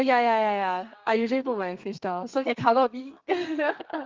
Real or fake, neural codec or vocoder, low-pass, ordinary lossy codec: fake; codec, 32 kHz, 1.9 kbps, SNAC; 7.2 kHz; Opus, 32 kbps